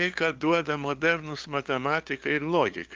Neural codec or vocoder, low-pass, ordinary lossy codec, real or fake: codec, 16 kHz, 2 kbps, FunCodec, trained on LibriTTS, 25 frames a second; 7.2 kHz; Opus, 32 kbps; fake